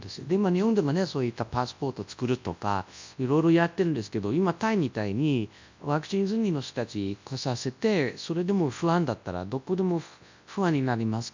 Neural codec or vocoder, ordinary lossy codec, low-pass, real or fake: codec, 24 kHz, 0.9 kbps, WavTokenizer, large speech release; MP3, 64 kbps; 7.2 kHz; fake